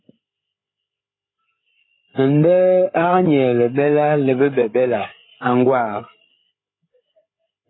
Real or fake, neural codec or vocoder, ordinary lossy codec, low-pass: fake; codec, 16 kHz, 8 kbps, FreqCodec, larger model; AAC, 16 kbps; 7.2 kHz